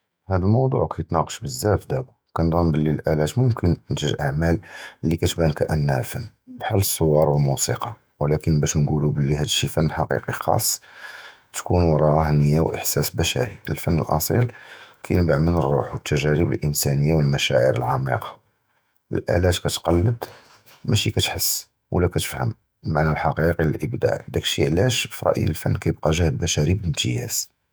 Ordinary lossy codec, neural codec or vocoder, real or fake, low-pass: none; autoencoder, 48 kHz, 128 numbers a frame, DAC-VAE, trained on Japanese speech; fake; none